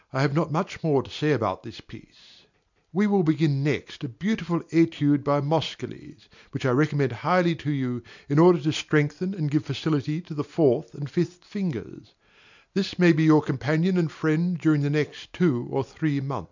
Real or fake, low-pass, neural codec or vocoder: real; 7.2 kHz; none